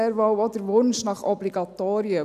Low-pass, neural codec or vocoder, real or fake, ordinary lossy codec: 14.4 kHz; none; real; none